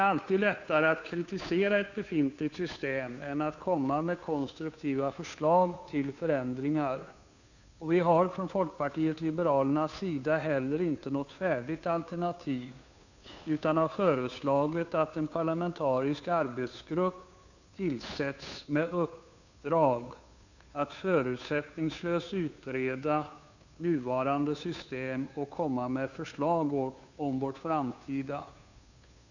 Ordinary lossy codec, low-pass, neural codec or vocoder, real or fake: none; 7.2 kHz; codec, 16 kHz, 2 kbps, FunCodec, trained on Chinese and English, 25 frames a second; fake